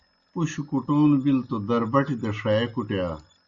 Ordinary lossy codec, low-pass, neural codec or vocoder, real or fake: Opus, 64 kbps; 7.2 kHz; none; real